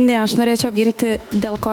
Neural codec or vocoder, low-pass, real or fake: autoencoder, 48 kHz, 32 numbers a frame, DAC-VAE, trained on Japanese speech; 19.8 kHz; fake